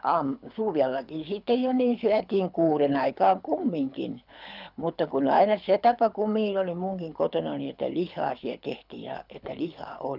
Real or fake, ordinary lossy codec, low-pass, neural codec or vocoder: fake; none; 5.4 kHz; codec, 24 kHz, 6 kbps, HILCodec